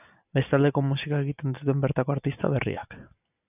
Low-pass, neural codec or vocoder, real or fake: 3.6 kHz; none; real